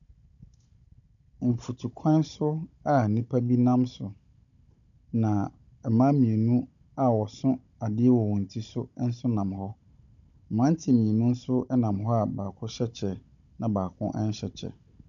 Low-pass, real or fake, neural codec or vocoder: 7.2 kHz; fake; codec, 16 kHz, 16 kbps, FunCodec, trained on Chinese and English, 50 frames a second